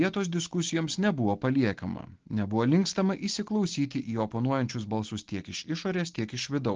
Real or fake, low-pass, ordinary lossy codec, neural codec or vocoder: real; 7.2 kHz; Opus, 16 kbps; none